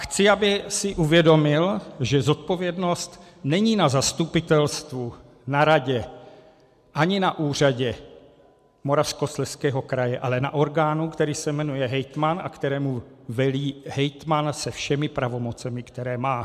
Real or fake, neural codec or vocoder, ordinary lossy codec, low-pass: real; none; MP3, 96 kbps; 14.4 kHz